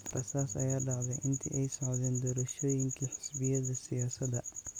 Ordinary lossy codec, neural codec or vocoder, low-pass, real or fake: none; none; 19.8 kHz; real